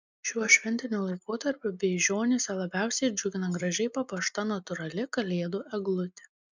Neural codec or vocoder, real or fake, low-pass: none; real; 7.2 kHz